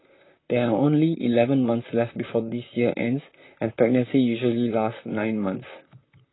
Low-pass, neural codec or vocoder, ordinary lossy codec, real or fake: 7.2 kHz; vocoder, 44.1 kHz, 128 mel bands, Pupu-Vocoder; AAC, 16 kbps; fake